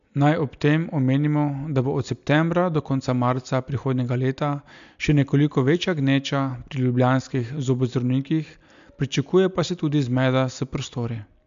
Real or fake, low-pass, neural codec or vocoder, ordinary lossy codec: real; 7.2 kHz; none; MP3, 64 kbps